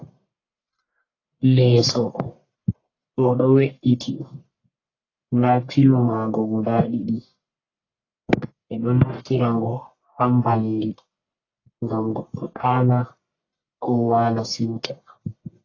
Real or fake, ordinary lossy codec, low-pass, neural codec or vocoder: fake; AAC, 32 kbps; 7.2 kHz; codec, 44.1 kHz, 1.7 kbps, Pupu-Codec